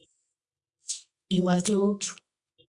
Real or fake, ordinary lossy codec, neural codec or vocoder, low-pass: fake; Opus, 64 kbps; codec, 24 kHz, 0.9 kbps, WavTokenizer, medium music audio release; 10.8 kHz